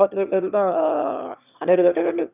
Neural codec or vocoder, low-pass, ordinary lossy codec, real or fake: autoencoder, 22.05 kHz, a latent of 192 numbers a frame, VITS, trained on one speaker; 3.6 kHz; none; fake